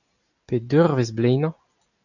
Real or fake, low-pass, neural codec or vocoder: real; 7.2 kHz; none